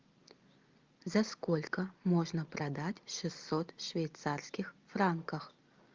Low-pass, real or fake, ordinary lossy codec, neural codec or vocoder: 7.2 kHz; real; Opus, 24 kbps; none